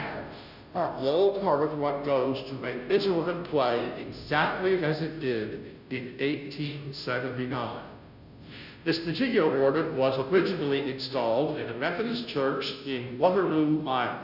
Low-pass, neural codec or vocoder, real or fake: 5.4 kHz; codec, 16 kHz, 0.5 kbps, FunCodec, trained on Chinese and English, 25 frames a second; fake